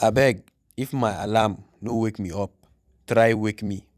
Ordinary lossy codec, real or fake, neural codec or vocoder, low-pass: none; fake; vocoder, 44.1 kHz, 128 mel bands every 256 samples, BigVGAN v2; 14.4 kHz